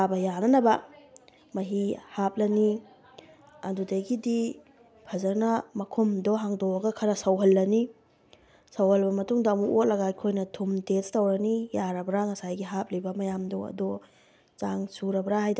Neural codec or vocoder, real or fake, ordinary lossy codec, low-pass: none; real; none; none